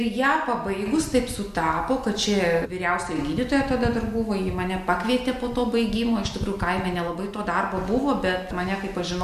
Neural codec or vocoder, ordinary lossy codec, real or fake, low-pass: none; MP3, 64 kbps; real; 14.4 kHz